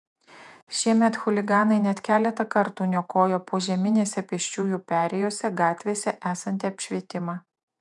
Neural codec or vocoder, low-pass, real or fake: vocoder, 48 kHz, 128 mel bands, Vocos; 10.8 kHz; fake